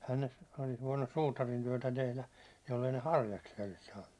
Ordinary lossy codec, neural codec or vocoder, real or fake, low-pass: none; none; real; none